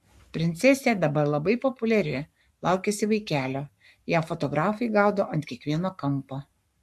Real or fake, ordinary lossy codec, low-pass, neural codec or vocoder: fake; AAC, 96 kbps; 14.4 kHz; codec, 44.1 kHz, 7.8 kbps, Pupu-Codec